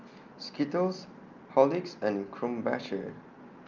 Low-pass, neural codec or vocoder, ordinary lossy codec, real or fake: 7.2 kHz; none; Opus, 24 kbps; real